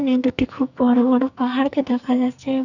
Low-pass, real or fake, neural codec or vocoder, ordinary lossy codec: 7.2 kHz; fake; codec, 44.1 kHz, 2.6 kbps, SNAC; none